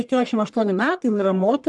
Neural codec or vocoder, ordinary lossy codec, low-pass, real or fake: codec, 44.1 kHz, 1.7 kbps, Pupu-Codec; MP3, 96 kbps; 10.8 kHz; fake